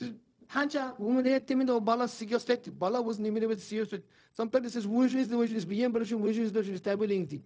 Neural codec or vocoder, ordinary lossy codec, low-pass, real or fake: codec, 16 kHz, 0.4 kbps, LongCat-Audio-Codec; none; none; fake